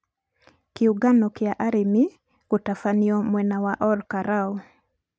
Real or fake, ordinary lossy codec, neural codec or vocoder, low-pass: real; none; none; none